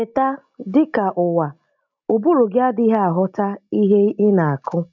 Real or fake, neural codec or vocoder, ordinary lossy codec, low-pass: real; none; none; 7.2 kHz